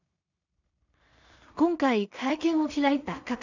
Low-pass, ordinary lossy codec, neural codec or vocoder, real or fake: 7.2 kHz; none; codec, 16 kHz in and 24 kHz out, 0.4 kbps, LongCat-Audio-Codec, two codebook decoder; fake